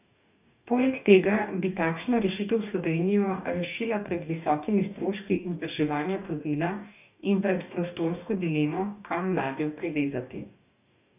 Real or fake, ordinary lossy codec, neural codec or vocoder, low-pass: fake; none; codec, 44.1 kHz, 2.6 kbps, DAC; 3.6 kHz